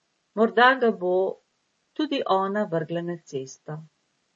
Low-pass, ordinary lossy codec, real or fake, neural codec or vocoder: 10.8 kHz; MP3, 32 kbps; real; none